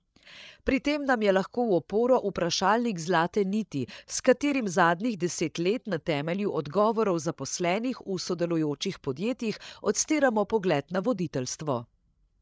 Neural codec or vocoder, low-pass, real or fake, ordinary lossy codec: codec, 16 kHz, 8 kbps, FreqCodec, larger model; none; fake; none